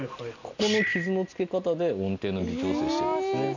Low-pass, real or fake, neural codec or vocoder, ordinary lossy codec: 7.2 kHz; real; none; none